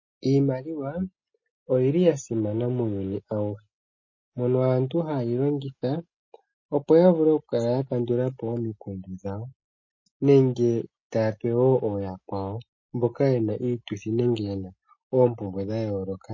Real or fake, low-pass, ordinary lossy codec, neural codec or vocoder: real; 7.2 kHz; MP3, 32 kbps; none